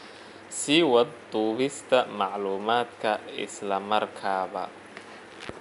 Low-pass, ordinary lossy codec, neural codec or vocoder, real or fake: 10.8 kHz; none; none; real